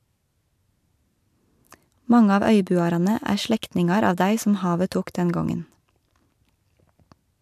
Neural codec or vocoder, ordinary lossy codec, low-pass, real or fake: none; MP3, 96 kbps; 14.4 kHz; real